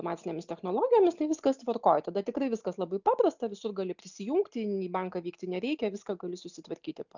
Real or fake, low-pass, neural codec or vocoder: real; 7.2 kHz; none